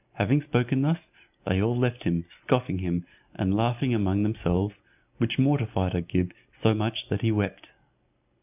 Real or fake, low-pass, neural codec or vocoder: real; 3.6 kHz; none